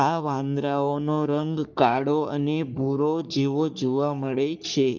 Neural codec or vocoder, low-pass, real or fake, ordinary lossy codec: codec, 44.1 kHz, 3.4 kbps, Pupu-Codec; 7.2 kHz; fake; none